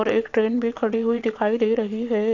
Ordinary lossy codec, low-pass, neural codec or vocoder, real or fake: none; 7.2 kHz; vocoder, 22.05 kHz, 80 mel bands, WaveNeXt; fake